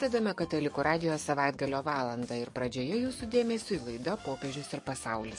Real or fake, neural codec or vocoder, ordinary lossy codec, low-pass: fake; codec, 44.1 kHz, 7.8 kbps, DAC; MP3, 48 kbps; 10.8 kHz